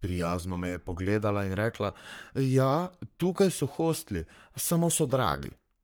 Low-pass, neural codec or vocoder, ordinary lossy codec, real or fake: none; codec, 44.1 kHz, 3.4 kbps, Pupu-Codec; none; fake